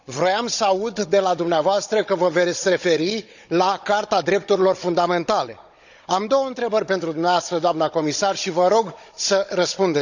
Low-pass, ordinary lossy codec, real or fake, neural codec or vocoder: 7.2 kHz; none; fake; codec, 16 kHz, 16 kbps, FunCodec, trained on Chinese and English, 50 frames a second